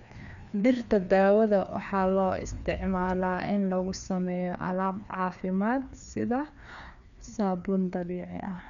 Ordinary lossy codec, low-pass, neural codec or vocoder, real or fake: none; 7.2 kHz; codec, 16 kHz, 2 kbps, FreqCodec, larger model; fake